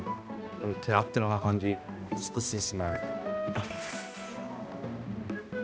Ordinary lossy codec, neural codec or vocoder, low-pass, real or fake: none; codec, 16 kHz, 1 kbps, X-Codec, HuBERT features, trained on balanced general audio; none; fake